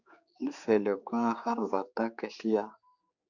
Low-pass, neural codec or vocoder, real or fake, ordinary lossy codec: 7.2 kHz; codec, 16 kHz, 4 kbps, X-Codec, HuBERT features, trained on general audio; fake; Opus, 64 kbps